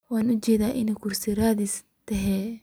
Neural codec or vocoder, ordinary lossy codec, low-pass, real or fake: none; none; none; real